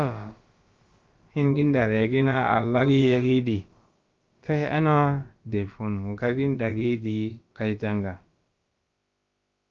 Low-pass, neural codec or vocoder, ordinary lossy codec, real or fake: 7.2 kHz; codec, 16 kHz, about 1 kbps, DyCAST, with the encoder's durations; Opus, 32 kbps; fake